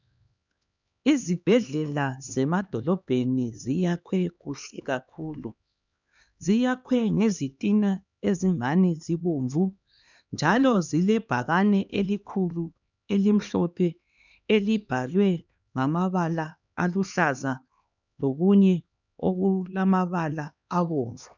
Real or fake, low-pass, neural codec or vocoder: fake; 7.2 kHz; codec, 16 kHz, 2 kbps, X-Codec, HuBERT features, trained on LibriSpeech